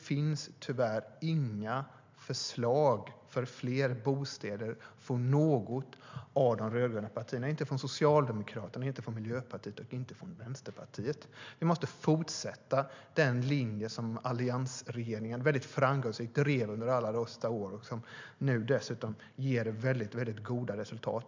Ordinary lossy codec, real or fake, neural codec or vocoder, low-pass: MP3, 64 kbps; real; none; 7.2 kHz